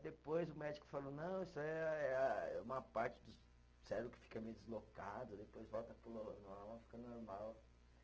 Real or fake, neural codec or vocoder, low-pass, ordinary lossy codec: real; none; 7.2 kHz; Opus, 16 kbps